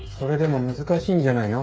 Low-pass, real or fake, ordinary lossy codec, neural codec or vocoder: none; fake; none; codec, 16 kHz, 8 kbps, FreqCodec, smaller model